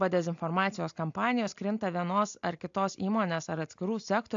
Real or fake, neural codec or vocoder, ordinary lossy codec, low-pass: real; none; AAC, 64 kbps; 7.2 kHz